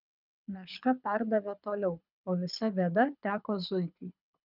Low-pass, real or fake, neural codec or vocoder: 5.4 kHz; fake; codec, 24 kHz, 6 kbps, HILCodec